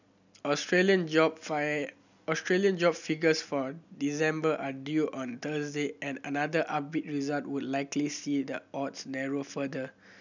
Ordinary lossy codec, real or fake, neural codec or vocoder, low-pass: none; real; none; 7.2 kHz